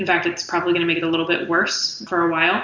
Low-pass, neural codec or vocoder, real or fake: 7.2 kHz; none; real